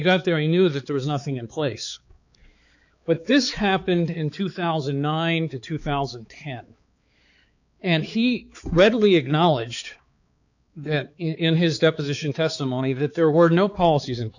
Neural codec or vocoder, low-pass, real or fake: codec, 16 kHz, 4 kbps, X-Codec, HuBERT features, trained on balanced general audio; 7.2 kHz; fake